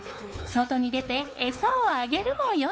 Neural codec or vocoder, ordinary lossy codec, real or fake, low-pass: codec, 16 kHz, 4 kbps, X-Codec, WavLM features, trained on Multilingual LibriSpeech; none; fake; none